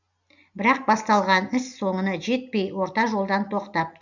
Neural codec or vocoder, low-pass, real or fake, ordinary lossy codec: none; 7.2 kHz; real; none